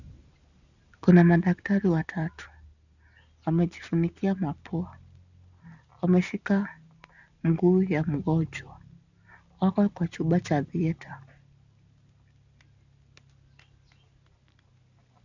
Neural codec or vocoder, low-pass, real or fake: none; 7.2 kHz; real